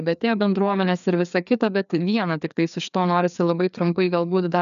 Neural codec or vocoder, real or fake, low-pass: codec, 16 kHz, 2 kbps, FreqCodec, larger model; fake; 7.2 kHz